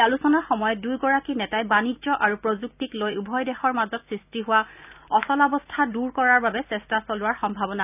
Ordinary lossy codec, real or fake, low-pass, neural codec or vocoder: none; real; 3.6 kHz; none